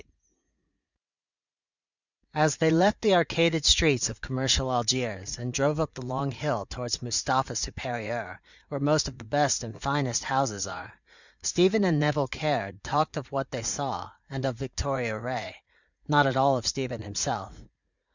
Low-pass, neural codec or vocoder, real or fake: 7.2 kHz; vocoder, 44.1 kHz, 80 mel bands, Vocos; fake